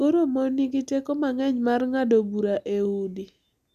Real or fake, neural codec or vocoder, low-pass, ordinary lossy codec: real; none; 14.4 kHz; none